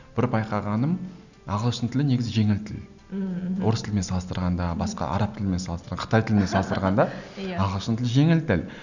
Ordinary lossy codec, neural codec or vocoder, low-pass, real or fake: none; none; 7.2 kHz; real